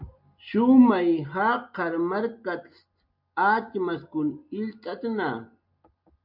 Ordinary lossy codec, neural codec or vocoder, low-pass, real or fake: MP3, 48 kbps; none; 5.4 kHz; real